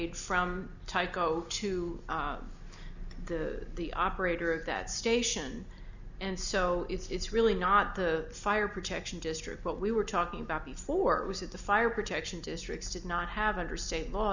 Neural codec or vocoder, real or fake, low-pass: none; real; 7.2 kHz